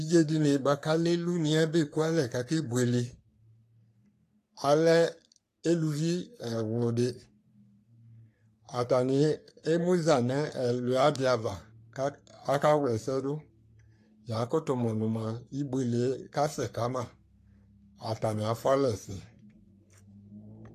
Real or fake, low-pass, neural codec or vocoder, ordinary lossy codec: fake; 14.4 kHz; codec, 44.1 kHz, 3.4 kbps, Pupu-Codec; AAC, 64 kbps